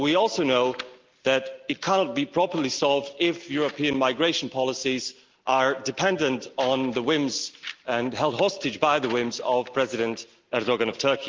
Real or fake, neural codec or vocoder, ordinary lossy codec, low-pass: real; none; Opus, 32 kbps; 7.2 kHz